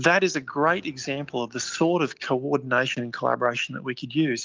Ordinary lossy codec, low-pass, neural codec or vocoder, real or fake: Opus, 24 kbps; 7.2 kHz; none; real